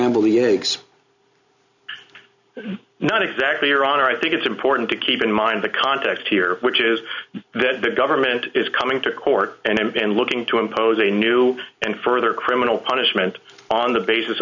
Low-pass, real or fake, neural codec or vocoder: 7.2 kHz; real; none